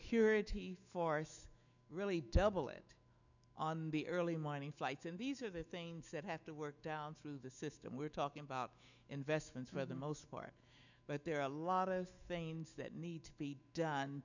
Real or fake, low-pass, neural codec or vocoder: fake; 7.2 kHz; autoencoder, 48 kHz, 128 numbers a frame, DAC-VAE, trained on Japanese speech